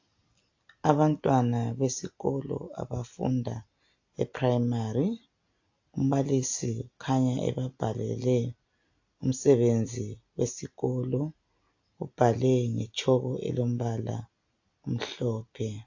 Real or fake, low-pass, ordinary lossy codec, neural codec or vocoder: real; 7.2 kHz; AAC, 48 kbps; none